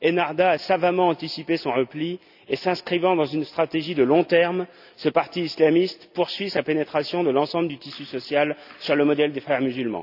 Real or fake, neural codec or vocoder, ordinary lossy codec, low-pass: real; none; none; 5.4 kHz